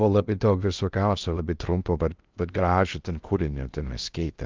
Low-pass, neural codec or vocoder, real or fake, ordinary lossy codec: 7.2 kHz; codec, 16 kHz in and 24 kHz out, 0.6 kbps, FocalCodec, streaming, 2048 codes; fake; Opus, 32 kbps